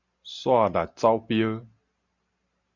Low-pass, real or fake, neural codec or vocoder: 7.2 kHz; real; none